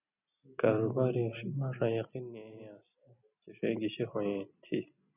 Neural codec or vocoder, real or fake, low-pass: none; real; 3.6 kHz